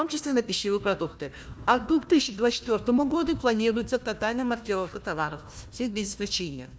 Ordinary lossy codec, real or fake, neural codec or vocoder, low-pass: none; fake; codec, 16 kHz, 1 kbps, FunCodec, trained on Chinese and English, 50 frames a second; none